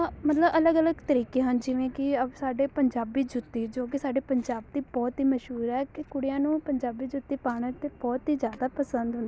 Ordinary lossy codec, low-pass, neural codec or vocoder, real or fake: none; none; none; real